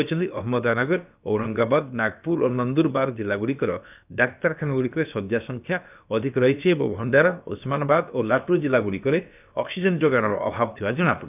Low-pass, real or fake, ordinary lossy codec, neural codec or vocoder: 3.6 kHz; fake; none; codec, 16 kHz, about 1 kbps, DyCAST, with the encoder's durations